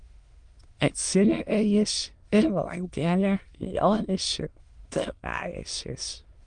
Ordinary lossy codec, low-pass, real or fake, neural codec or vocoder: Opus, 24 kbps; 9.9 kHz; fake; autoencoder, 22.05 kHz, a latent of 192 numbers a frame, VITS, trained on many speakers